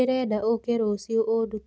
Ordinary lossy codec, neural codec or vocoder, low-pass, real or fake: none; none; none; real